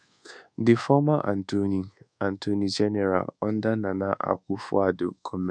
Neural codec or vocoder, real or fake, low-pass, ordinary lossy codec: codec, 24 kHz, 1.2 kbps, DualCodec; fake; 9.9 kHz; none